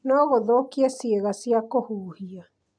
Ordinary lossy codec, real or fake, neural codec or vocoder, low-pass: none; real; none; 9.9 kHz